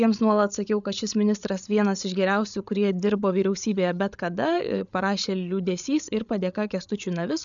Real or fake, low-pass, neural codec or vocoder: fake; 7.2 kHz; codec, 16 kHz, 8 kbps, FreqCodec, larger model